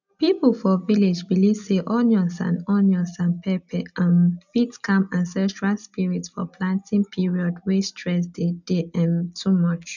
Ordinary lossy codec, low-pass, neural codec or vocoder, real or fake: none; 7.2 kHz; none; real